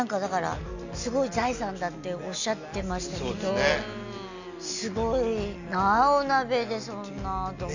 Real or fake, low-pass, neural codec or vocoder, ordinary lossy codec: real; 7.2 kHz; none; none